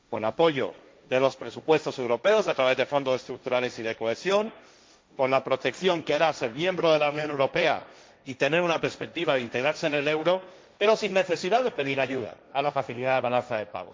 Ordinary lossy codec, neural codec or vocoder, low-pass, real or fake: none; codec, 16 kHz, 1.1 kbps, Voila-Tokenizer; none; fake